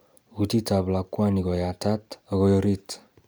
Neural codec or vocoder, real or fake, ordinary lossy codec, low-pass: none; real; none; none